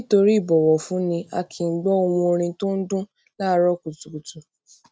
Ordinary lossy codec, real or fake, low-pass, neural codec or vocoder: none; real; none; none